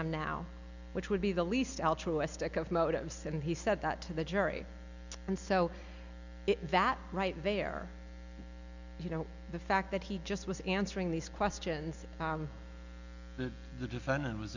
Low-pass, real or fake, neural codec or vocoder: 7.2 kHz; real; none